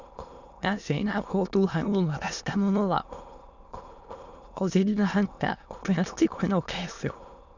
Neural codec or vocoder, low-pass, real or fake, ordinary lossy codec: autoencoder, 22.05 kHz, a latent of 192 numbers a frame, VITS, trained on many speakers; 7.2 kHz; fake; none